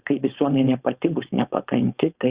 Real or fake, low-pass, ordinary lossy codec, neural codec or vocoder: fake; 3.6 kHz; Opus, 64 kbps; codec, 16 kHz, 4.8 kbps, FACodec